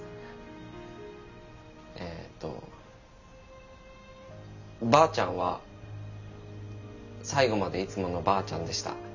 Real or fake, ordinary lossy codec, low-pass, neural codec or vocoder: real; none; 7.2 kHz; none